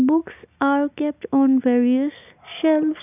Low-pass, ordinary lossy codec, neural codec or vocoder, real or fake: 3.6 kHz; none; none; real